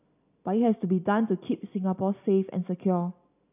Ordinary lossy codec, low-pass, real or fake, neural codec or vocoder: none; 3.6 kHz; real; none